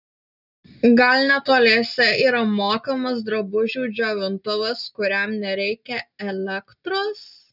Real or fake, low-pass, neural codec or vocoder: real; 5.4 kHz; none